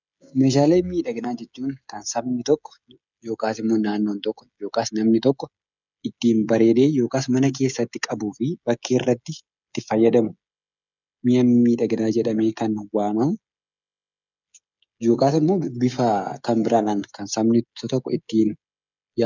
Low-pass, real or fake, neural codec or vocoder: 7.2 kHz; fake; codec, 16 kHz, 16 kbps, FreqCodec, smaller model